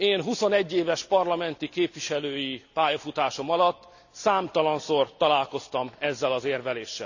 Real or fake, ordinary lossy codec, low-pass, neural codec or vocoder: real; none; 7.2 kHz; none